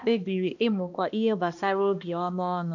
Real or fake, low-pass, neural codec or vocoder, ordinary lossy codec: fake; 7.2 kHz; codec, 16 kHz, 1 kbps, X-Codec, HuBERT features, trained on balanced general audio; none